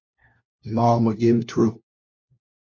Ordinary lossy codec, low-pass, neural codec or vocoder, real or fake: MP3, 48 kbps; 7.2 kHz; codec, 16 kHz, 1 kbps, FunCodec, trained on LibriTTS, 50 frames a second; fake